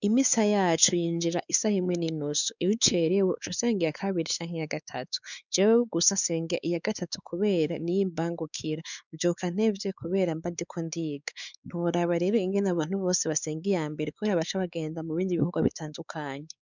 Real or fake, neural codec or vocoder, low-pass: fake; codec, 16 kHz, 4 kbps, X-Codec, WavLM features, trained on Multilingual LibriSpeech; 7.2 kHz